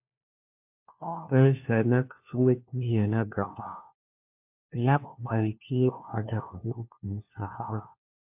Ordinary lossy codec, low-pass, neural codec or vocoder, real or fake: MP3, 32 kbps; 3.6 kHz; codec, 16 kHz, 1 kbps, FunCodec, trained on LibriTTS, 50 frames a second; fake